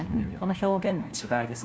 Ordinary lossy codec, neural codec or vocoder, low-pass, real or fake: none; codec, 16 kHz, 1 kbps, FunCodec, trained on LibriTTS, 50 frames a second; none; fake